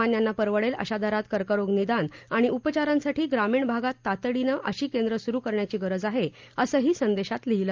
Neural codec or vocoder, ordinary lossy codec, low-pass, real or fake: none; Opus, 32 kbps; 7.2 kHz; real